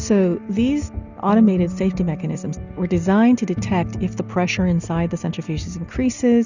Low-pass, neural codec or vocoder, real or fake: 7.2 kHz; none; real